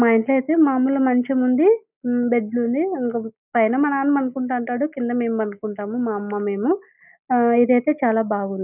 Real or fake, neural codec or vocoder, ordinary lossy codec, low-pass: real; none; none; 3.6 kHz